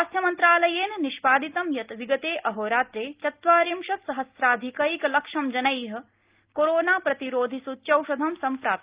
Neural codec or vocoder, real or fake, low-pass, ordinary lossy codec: none; real; 3.6 kHz; Opus, 32 kbps